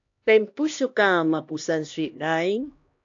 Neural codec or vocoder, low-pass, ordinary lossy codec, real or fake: codec, 16 kHz, 1 kbps, X-Codec, HuBERT features, trained on LibriSpeech; 7.2 kHz; AAC, 48 kbps; fake